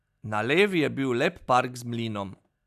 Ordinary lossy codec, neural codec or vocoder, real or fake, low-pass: AAC, 96 kbps; none; real; 14.4 kHz